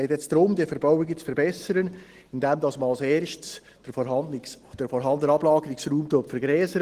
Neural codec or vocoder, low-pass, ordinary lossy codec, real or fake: none; 14.4 kHz; Opus, 16 kbps; real